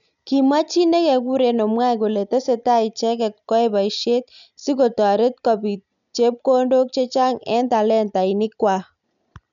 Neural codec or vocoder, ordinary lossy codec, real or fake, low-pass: none; none; real; 7.2 kHz